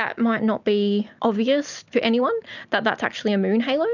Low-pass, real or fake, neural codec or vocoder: 7.2 kHz; real; none